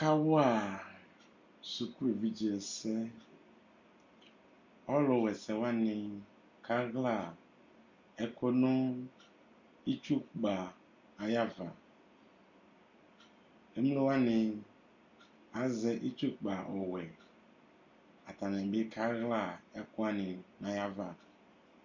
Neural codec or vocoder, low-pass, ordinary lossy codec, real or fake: none; 7.2 kHz; MP3, 48 kbps; real